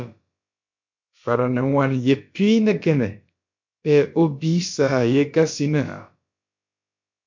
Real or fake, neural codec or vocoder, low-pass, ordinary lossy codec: fake; codec, 16 kHz, about 1 kbps, DyCAST, with the encoder's durations; 7.2 kHz; MP3, 48 kbps